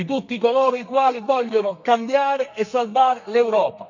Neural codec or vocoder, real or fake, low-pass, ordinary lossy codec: codec, 32 kHz, 1.9 kbps, SNAC; fake; 7.2 kHz; none